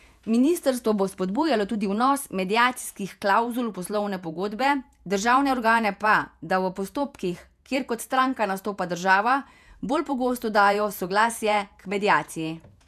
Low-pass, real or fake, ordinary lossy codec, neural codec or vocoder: 14.4 kHz; fake; none; vocoder, 48 kHz, 128 mel bands, Vocos